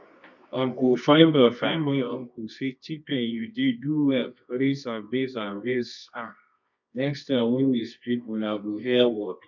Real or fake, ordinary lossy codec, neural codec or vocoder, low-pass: fake; none; codec, 24 kHz, 0.9 kbps, WavTokenizer, medium music audio release; 7.2 kHz